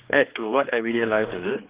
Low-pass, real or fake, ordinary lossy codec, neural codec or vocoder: 3.6 kHz; fake; Opus, 24 kbps; codec, 16 kHz, 2 kbps, X-Codec, HuBERT features, trained on general audio